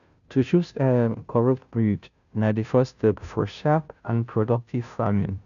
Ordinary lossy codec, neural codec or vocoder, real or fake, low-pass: none; codec, 16 kHz, 0.5 kbps, FunCodec, trained on Chinese and English, 25 frames a second; fake; 7.2 kHz